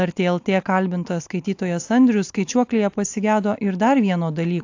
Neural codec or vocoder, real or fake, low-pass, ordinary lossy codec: none; real; 7.2 kHz; AAC, 48 kbps